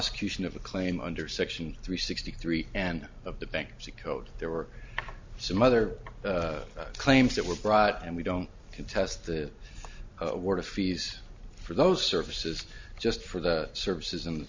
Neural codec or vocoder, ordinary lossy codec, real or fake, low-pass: none; MP3, 64 kbps; real; 7.2 kHz